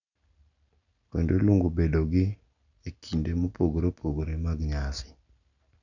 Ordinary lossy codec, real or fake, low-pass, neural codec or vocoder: none; real; 7.2 kHz; none